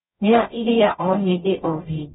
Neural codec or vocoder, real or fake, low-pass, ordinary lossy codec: codec, 44.1 kHz, 0.9 kbps, DAC; fake; 19.8 kHz; AAC, 16 kbps